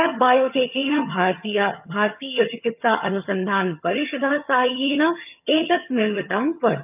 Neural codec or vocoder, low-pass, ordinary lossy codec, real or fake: vocoder, 22.05 kHz, 80 mel bands, HiFi-GAN; 3.6 kHz; none; fake